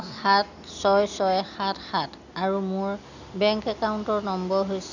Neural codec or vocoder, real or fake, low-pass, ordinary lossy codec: none; real; 7.2 kHz; none